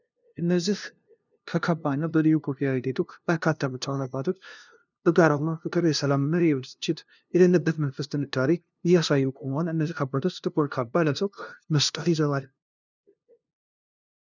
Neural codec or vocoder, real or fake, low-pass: codec, 16 kHz, 0.5 kbps, FunCodec, trained on LibriTTS, 25 frames a second; fake; 7.2 kHz